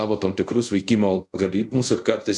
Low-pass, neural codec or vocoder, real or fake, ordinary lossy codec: 10.8 kHz; codec, 24 kHz, 0.5 kbps, DualCodec; fake; MP3, 64 kbps